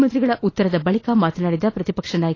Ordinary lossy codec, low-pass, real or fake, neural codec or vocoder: AAC, 32 kbps; 7.2 kHz; real; none